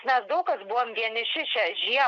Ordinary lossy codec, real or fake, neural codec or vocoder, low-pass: AAC, 64 kbps; real; none; 7.2 kHz